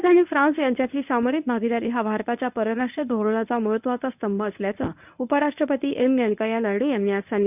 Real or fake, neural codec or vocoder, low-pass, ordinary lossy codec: fake; codec, 24 kHz, 0.9 kbps, WavTokenizer, medium speech release version 1; 3.6 kHz; none